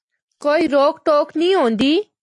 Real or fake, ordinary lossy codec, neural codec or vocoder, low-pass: real; AAC, 48 kbps; none; 10.8 kHz